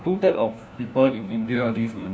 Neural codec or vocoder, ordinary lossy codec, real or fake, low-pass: codec, 16 kHz, 1 kbps, FunCodec, trained on LibriTTS, 50 frames a second; none; fake; none